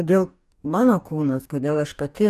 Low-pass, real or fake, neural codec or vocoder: 14.4 kHz; fake; codec, 44.1 kHz, 2.6 kbps, DAC